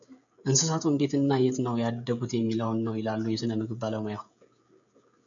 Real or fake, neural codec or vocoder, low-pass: fake; codec, 16 kHz, 16 kbps, FreqCodec, smaller model; 7.2 kHz